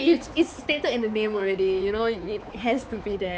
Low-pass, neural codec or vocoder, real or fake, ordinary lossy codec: none; codec, 16 kHz, 4 kbps, X-Codec, HuBERT features, trained on balanced general audio; fake; none